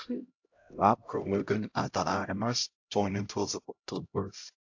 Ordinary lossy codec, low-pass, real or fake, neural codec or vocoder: AAC, 48 kbps; 7.2 kHz; fake; codec, 16 kHz, 0.5 kbps, X-Codec, HuBERT features, trained on LibriSpeech